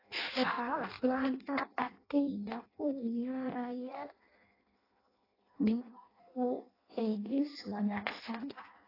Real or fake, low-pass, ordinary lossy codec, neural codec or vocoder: fake; 5.4 kHz; AAC, 24 kbps; codec, 16 kHz in and 24 kHz out, 0.6 kbps, FireRedTTS-2 codec